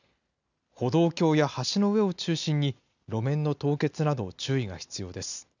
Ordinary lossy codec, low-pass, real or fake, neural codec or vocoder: none; 7.2 kHz; real; none